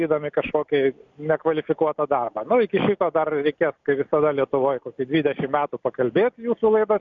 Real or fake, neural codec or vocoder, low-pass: real; none; 7.2 kHz